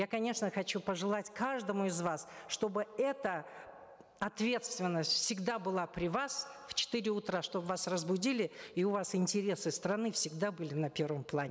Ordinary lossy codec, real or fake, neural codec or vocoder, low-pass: none; real; none; none